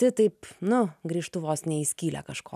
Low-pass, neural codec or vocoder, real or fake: 14.4 kHz; none; real